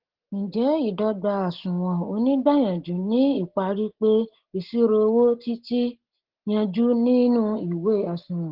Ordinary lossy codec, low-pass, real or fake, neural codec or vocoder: Opus, 16 kbps; 5.4 kHz; real; none